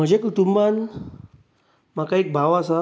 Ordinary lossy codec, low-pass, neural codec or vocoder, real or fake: none; none; none; real